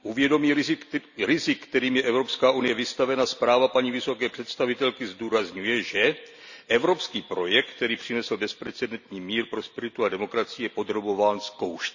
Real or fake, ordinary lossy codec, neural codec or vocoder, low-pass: real; none; none; 7.2 kHz